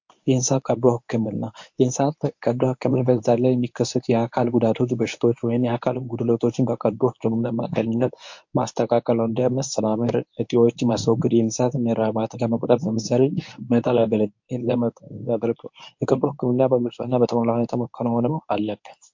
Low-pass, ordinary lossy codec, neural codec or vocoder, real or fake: 7.2 kHz; MP3, 48 kbps; codec, 24 kHz, 0.9 kbps, WavTokenizer, medium speech release version 1; fake